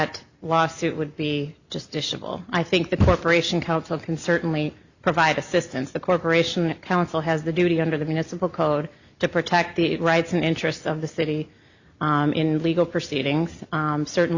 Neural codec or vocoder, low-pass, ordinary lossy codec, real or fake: none; 7.2 kHz; Opus, 64 kbps; real